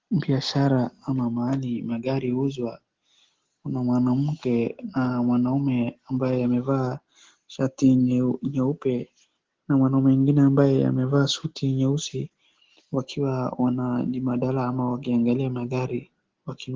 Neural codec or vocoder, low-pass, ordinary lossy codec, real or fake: none; 7.2 kHz; Opus, 16 kbps; real